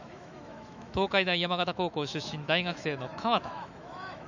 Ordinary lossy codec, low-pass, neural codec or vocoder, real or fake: none; 7.2 kHz; autoencoder, 48 kHz, 128 numbers a frame, DAC-VAE, trained on Japanese speech; fake